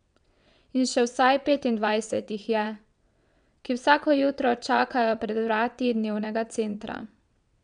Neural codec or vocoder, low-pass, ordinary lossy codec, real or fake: vocoder, 22.05 kHz, 80 mel bands, WaveNeXt; 9.9 kHz; none; fake